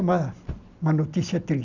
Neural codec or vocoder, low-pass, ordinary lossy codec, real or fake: none; 7.2 kHz; none; real